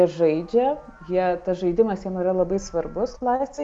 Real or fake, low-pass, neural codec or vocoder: real; 10.8 kHz; none